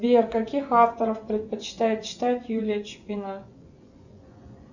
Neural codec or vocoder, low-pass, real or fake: vocoder, 24 kHz, 100 mel bands, Vocos; 7.2 kHz; fake